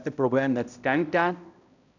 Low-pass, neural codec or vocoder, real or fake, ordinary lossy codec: 7.2 kHz; codec, 16 kHz, 0.5 kbps, X-Codec, HuBERT features, trained on balanced general audio; fake; none